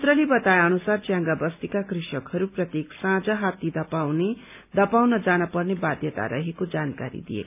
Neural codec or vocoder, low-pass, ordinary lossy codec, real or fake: none; 3.6 kHz; none; real